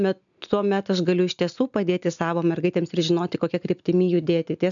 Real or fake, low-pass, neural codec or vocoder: real; 7.2 kHz; none